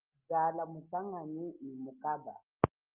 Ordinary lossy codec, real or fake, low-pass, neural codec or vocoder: Opus, 32 kbps; real; 3.6 kHz; none